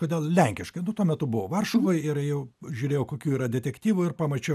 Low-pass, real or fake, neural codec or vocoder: 14.4 kHz; real; none